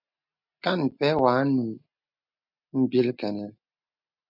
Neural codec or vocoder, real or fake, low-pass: none; real; 5.4 kHz